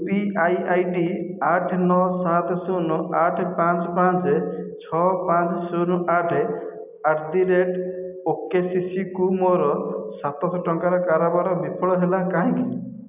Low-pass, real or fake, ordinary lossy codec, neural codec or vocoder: 3.6 kHz; real; none; none